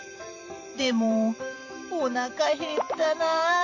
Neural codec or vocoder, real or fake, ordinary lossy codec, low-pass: vocoder, 44.1 kHz, 128 mel bands every 512 samples, BigVGAN v2; fake; none; 7.2 kHz